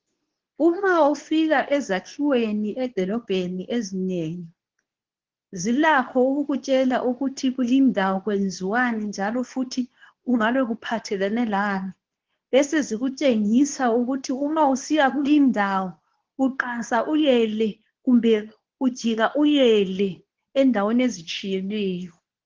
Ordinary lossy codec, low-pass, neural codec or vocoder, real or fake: Opus, 16 kbps; 7.2 kHz; codec, 24 kHz, 0.9 kbps, WavTokenizer, medium speech release version 2; fake